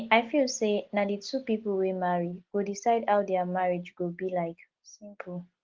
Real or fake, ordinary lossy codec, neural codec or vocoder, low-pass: real; Opus, 16 kbps; none; 7.2 kHz